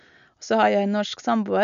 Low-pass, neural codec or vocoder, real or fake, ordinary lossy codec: 7.2 kHz; none; real; none